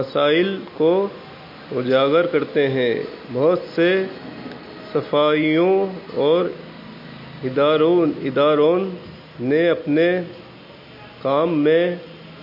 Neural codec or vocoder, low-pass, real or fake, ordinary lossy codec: none; 5.4 kHz; real; MP3, 32 kbps